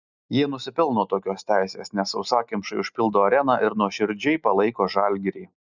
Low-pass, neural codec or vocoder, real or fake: 7.2 kHz; none; real